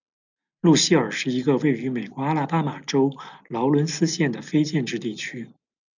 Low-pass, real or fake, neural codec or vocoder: 7.2 kHz; real; none